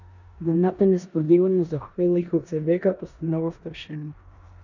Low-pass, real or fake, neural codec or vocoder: 7.2 kHz; fake; codec, 16 kHz in and 24 kHz out, 0.9 kbps, LongCat-Audio-Codec, four codebook decoder